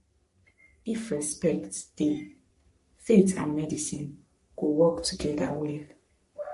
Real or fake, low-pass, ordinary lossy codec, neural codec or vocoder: fake; 14.4 kHz; MP3, 48 kbps; codec, 44.1 kHz, 3.4 kbps, Pupu-Codec